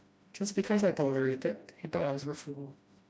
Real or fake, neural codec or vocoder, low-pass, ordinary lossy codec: fake; codec, 16 kHz, 1 kbps, FreqCodec, smaller model; none; none